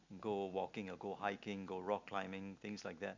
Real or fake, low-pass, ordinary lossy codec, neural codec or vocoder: real; 7.2 kHz; MP3, 48 kbps; none